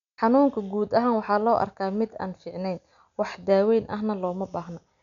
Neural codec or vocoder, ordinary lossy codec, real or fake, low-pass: none; none; real; 7.2 kHz